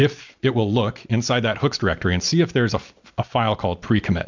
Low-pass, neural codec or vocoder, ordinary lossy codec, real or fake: 7.2 kHz; none; MP3, 64 kbps; real